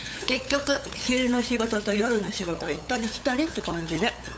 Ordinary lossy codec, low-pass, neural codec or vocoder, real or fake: none; none; codec, 16 kHz, 8 kbps, FunCodec, trained on LibriTTS, 25 frames a second; fake